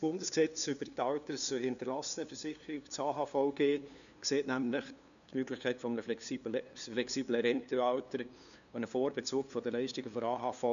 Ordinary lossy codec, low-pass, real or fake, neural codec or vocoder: none; 7.2 kHz; fake; codec, 16 kHz, 2 kbps, FunCodec, trained on LibriTTS, 25 frames a second